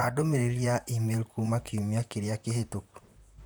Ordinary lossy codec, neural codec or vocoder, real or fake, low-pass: none; vocoder, 44.1 kHz, 128 mel bands, Pupu-Vocoder; fake; none